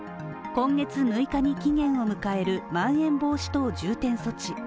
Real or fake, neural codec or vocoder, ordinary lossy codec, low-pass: real; none; none; none